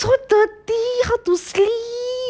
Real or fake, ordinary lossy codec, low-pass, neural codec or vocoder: real; none; none; none